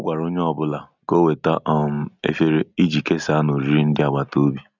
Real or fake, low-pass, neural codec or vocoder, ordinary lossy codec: real; 7.2 kHz; none; none